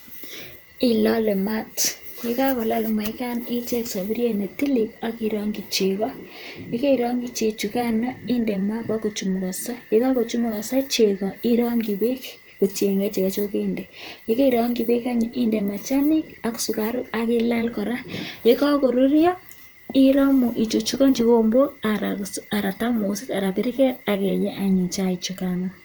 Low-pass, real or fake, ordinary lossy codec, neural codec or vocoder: none; fake; none; vocoder, 44.1 kHz, 128 mel bands, Pupu-Vocoder